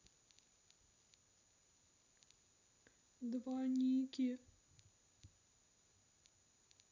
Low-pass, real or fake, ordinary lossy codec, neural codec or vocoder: 7.2 kHz; real; none; none